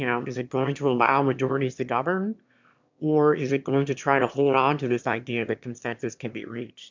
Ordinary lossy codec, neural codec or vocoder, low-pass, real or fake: MP3, 64 kbps; autoencoder, 22.05 kHz, a latent of 192 numbers a frame, VITS, trained on one speaker; 7.2 kHz; fake